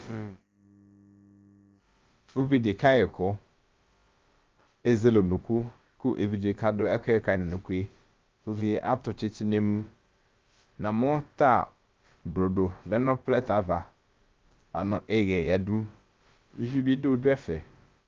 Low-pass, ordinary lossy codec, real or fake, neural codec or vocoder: 7.2 kHz; Opus, 24 kbps; fake; codec, 16 kHz, about 1 kbps, DyCAST, with the encoder's durations